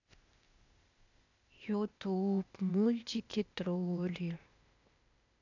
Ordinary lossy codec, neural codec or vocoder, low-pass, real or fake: none; codec, 16 kHz, 0.8 kbps, ZipCodec; 7.2 kHz; fake